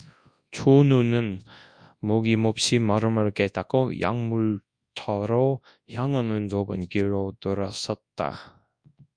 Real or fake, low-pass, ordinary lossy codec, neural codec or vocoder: fake; 9.9 kHz; Opus, 64 kbps; codec, 24 kHz, 0.9 kbps, WavTokenizer, large speech release